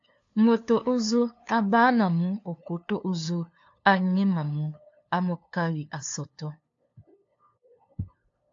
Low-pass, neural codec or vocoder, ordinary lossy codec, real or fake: 7.2 kHz; codec, 16 kHz, 2 kbps, FunCodec, trained on LibriTTS, 25 frames a second; AAC, 48 kbps; fake